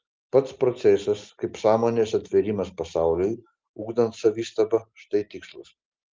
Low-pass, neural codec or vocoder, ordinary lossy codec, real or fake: 7.2 kHz; none; Opus, 32 kbps; real